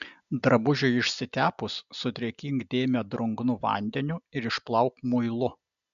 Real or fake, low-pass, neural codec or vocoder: real; 7.2 kHz; none